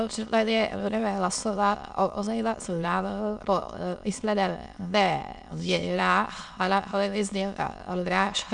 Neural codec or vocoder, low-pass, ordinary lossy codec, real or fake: autoencoder, 22.05 kHz, a latent of 192 numbers a frame, VITS, trained on many speakers; 9.9 kHz; MP3, 96 kbps; fake